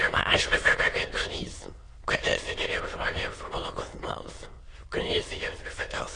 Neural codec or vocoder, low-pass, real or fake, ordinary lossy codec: autoencoder, 22.05 kHz, a latent of 192 numbers a frame, VITS, trained on many speakers; 9.9 kHz; fake; AAC, 32 kbps